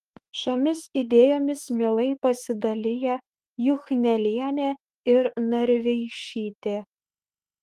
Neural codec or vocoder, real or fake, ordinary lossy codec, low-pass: autoencoder, 48 kHz, 32 numbers a frame, DAC-VAE, trained on Japanese speech; fake; Opus, 24 kbps; 14.4 kHz